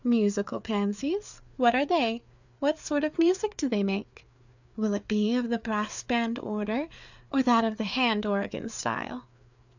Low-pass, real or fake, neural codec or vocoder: 7.2 kHz; fake; codec, 16 kHz, 4 kbps, FreqCodec, larger model